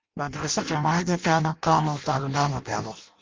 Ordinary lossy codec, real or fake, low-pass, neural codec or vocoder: Opus, 32 kbps; fake; 7.2 kHz; codec, 16 kHz in and 24 kHz out, 0.6 kbps, FireRedTTS-2 codec